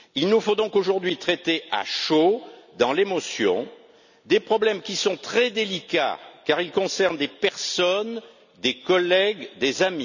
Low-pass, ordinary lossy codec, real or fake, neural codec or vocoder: 7.2 kHz; none; real; none